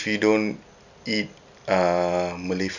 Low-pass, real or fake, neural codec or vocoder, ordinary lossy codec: 7.2 kHz; real; none; none